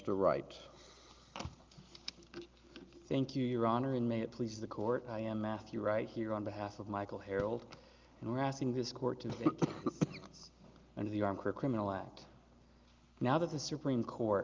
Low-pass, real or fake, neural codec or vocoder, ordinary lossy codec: 7.2 kHz; real; none; Opus, 24 kbps